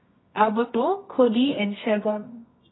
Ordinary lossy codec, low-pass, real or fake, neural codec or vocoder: AAC, 16 kbps; 7.2 kHz; fake; codec, 24 kHz, 0.9 kbps, WavTokenizer, medium music audio release